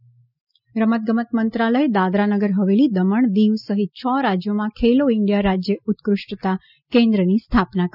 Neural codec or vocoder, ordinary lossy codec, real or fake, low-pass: none; none; real; 5.4 kHz